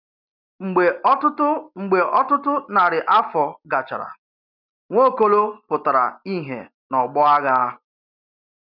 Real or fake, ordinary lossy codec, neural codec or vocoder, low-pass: real; none; none; 5.4 kHz